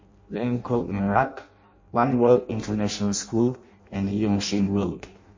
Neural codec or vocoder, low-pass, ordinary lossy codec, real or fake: codec, 16 kHz in and 24 kHz out, 0.6 kbps, FireRedTTS-2 codec; 7.2 kHz; MP3, 32 kbps; fake